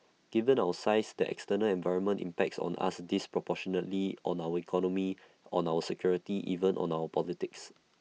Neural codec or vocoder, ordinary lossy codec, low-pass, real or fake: none; none; none; real